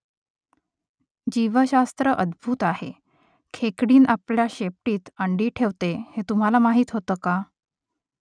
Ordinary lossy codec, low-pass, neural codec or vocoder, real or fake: none; 9.9 kHz; none; real